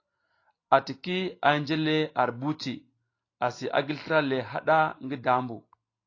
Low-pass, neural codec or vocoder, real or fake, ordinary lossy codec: 7.2 kHz; none; real; AAC, 32 kbps